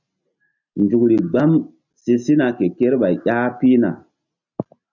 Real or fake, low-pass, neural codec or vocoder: real; 7.2 kHz; none